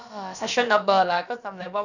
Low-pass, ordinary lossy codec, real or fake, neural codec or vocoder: 7.2 kHz; none; fake; codec, 16 kHz, about 1 kbps, DyCAST, with the encoder's durations